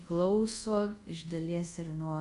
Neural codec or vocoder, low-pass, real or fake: codec, 24 kHz, 0.5 kbps, DualCodec; 10.8 kHz; fake